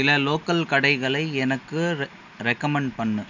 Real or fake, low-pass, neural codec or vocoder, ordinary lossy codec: real; 7.2 kHz; none; none